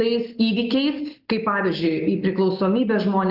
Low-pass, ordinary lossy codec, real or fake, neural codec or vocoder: 5.4 kHz; Opus, 24 kbps; real; none